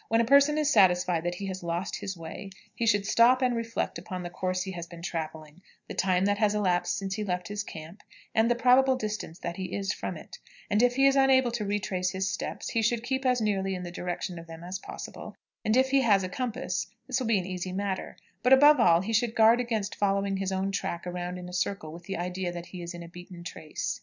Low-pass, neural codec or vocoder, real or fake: 7.2 kHz; none; real